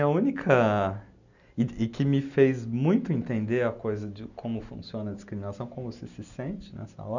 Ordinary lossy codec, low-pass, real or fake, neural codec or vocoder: none; 7.2 kHz; real; none